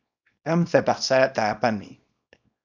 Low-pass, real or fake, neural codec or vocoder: 7.2 kHz; fake; codec, 24 kHz, 0.9 kbps, WavTokenizer, small release